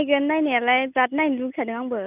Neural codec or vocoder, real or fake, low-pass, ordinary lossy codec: none; real; 3.6 kHz; none